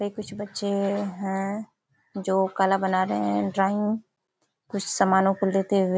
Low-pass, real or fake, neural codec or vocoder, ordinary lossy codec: none; real; none; none